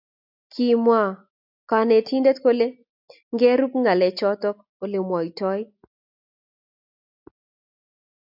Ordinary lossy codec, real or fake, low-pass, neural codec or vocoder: AAC, 48 kbps; real; 5.4 kHz; none